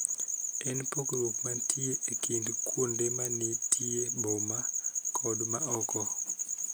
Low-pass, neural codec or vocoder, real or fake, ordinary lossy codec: none; none; real; none